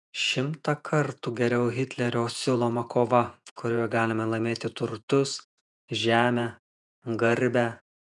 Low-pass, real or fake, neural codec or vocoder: 10.8 kHz; fake; vocoder, 48 kHz, 128 mel bands, Vocos